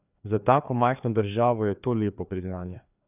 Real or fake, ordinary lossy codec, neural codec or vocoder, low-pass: fake; none; codec, 16 kHz, 2 kbps, FreqCodec, larger model; 3.6 kHz